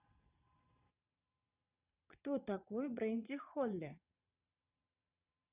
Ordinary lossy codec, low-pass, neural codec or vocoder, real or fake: none; 3.6 kHz; vocoder, 22.05 kHz, 80 mel bands, Vocos; fake